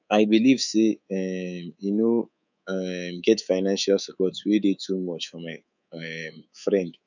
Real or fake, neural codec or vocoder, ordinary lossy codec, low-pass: fake; codec, 24 kHz, 3.1 kbps, DualCodec; none; 7.2 kHz